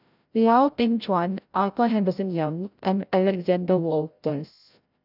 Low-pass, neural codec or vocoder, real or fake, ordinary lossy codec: 5.4 kHz; codec, 16 kHz, 0.5 kbps, FreqCodec, larger model; fake; none